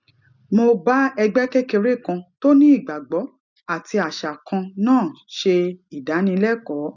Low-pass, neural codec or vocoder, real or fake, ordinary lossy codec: 7.2 kHz; none; real; none